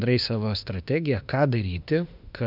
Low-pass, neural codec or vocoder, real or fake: 5.4 kHz; autoencoder, 48 kHz, 32 numbers a frame, DAC-VAE, trained on Japanese speech; fake